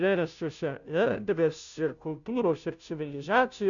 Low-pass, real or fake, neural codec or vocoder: 7.2 kHz; fake; codec, 16 kHz, 0.5 kbps, FunCodec, trained on Chinese and English, 25 frames a second